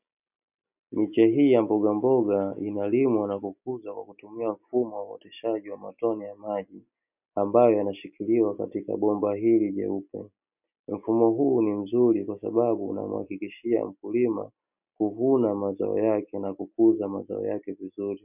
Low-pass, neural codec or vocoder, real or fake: 3.6 kHz; none; real